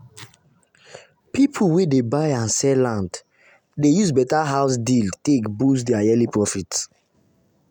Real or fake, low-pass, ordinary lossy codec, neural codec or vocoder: real; 19.8 kHz; none; none